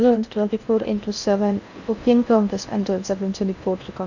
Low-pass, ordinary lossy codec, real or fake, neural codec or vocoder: 7.2 kHz; none; fake; codec, 16 kHz in and 24 kHz out, 0.6 kbps, FocalCodec, streaming, 4096 codes